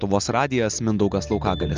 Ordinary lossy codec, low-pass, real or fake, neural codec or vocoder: Opus, 32 kbps; 7.2 kHz; real; none